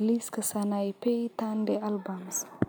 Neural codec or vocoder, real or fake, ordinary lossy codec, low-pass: none; real; none; none